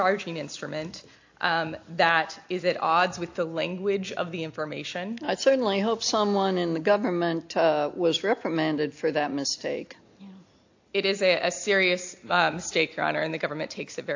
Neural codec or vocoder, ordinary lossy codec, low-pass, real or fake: none; AAC, 48 kbps; 7.2 kHz; real